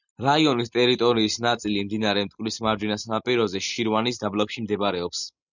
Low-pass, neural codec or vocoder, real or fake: 7.2 kHz; none; real